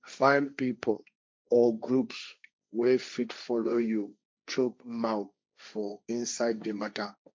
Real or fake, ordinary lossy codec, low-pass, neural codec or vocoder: fake; none; none; codec, 16 kHz, 1.1 kbps, Voila-Tokenizer